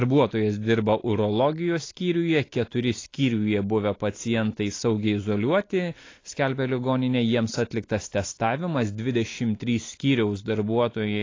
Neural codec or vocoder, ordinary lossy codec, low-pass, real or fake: none; AAC, 32 kbps; 7.2 kHz; real